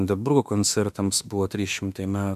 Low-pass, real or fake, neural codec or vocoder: 14.4 kHz; fake; autoencoder, 48 kHz, 32 numbers a frame, DAC-VAE, trained on Japanese speech